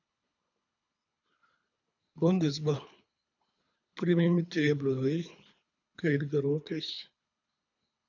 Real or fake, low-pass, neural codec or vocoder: fake; 7.2 kHz; codec, 24 kHz, 3 kbps, HILCodec